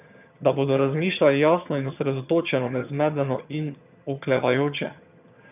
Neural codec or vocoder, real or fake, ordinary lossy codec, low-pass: vocoder, 22.05 kHz, 80 mel bands, HiFi-GAN; fake; none; 3.6 kHz